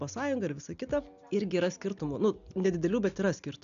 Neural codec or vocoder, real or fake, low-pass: none; real; 7.2 kHz